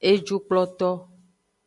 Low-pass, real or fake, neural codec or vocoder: 10.8 kHz; real; none